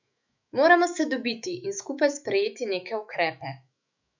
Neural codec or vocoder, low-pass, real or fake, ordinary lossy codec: autoencoder, 48 kHz, 128 numbers a frame, DAC-VAE, trained on Japanese speech; 7.2 kHz; fake; none